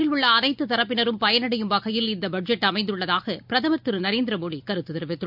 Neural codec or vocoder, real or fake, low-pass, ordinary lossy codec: none; real; 5.4 kHz; none